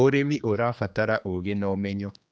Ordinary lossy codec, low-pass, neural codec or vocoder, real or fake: none; none; codec, 16 kHz, 2 kbps, X-Codec, HuBERT features, trained on general audio; fake